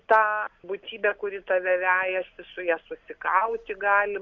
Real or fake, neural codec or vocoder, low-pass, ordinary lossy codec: real; none; 7.2 kHz; MP3, 48 kbps